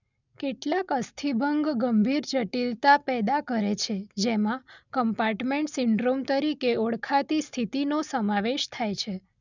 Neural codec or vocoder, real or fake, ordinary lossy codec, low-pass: none; real; none; 7.2 kHz